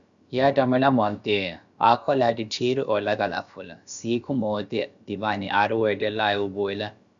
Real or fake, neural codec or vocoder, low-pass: fake; codec, 16 kHz, about 1 kbps, DyCAST, with the encoder's durations; 7.2 kHz